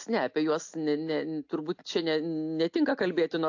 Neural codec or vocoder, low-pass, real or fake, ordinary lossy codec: none; 7.2 kHz; real; AAC, 48 kbps